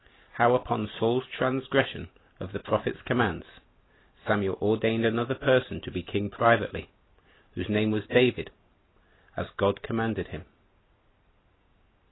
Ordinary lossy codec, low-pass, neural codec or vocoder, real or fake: AAC, 16 kbps; 7.2 kHz; none; real